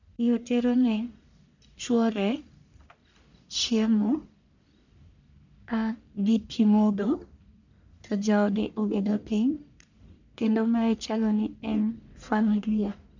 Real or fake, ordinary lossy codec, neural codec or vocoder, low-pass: fake; none; codec, 44.1 kHz, 1.7 kbps, Pupu-Codec; 7.2 kHz